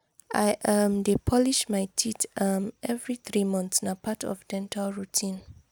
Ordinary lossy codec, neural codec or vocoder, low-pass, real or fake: none; none; none; real